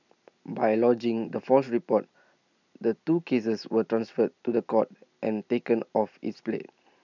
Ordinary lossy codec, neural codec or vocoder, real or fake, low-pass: none; none; real; 7.2 kHz